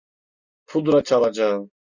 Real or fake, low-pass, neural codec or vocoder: real; 7.2 kHz; none